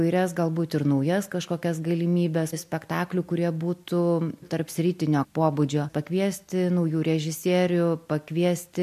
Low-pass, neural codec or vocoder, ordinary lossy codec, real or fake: 14.4 kHz; none; MP3, 64 kbps; real